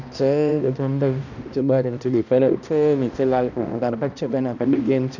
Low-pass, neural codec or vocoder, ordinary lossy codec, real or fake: 7.2 kHz; codec, 16 kHz, 1 kbps, X-Codec, HuBERT features, trained on balanced general audio; none; fake